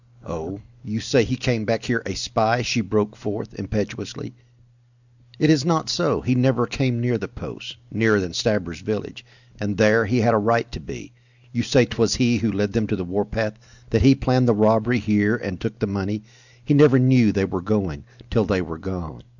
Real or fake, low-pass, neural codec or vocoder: real; 7.2 kHz; none